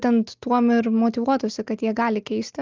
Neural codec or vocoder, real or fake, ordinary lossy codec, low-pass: none; real; Opus, 32 kbps; 7.2 kHz